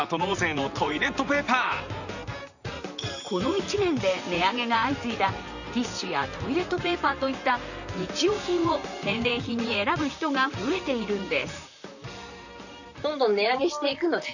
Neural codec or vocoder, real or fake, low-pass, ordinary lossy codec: vocoder, 44.1 kHz, 128 mel bands, Pupu-Vocoder; fake; 7.2 kHz; none